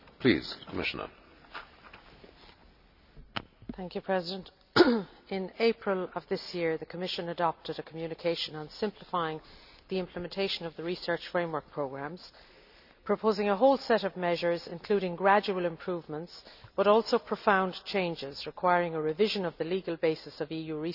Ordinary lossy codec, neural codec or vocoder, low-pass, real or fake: none; none; 5.4 kHz; real